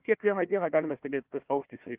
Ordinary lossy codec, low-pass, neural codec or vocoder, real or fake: Opus, 32 kbps; 3.6 kHz; codec, 16 kHz, 1 kbps, FunCodec, trained on Chinese and English, 50 frames a second; fake